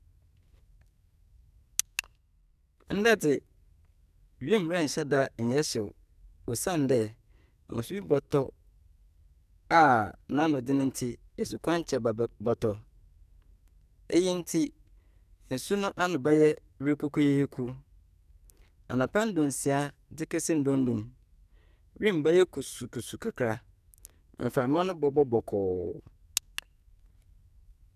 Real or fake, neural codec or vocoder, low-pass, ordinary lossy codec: fake; codec, 44.1 kHz, 2.6 kbps, SNAC; 14.4 kHz; none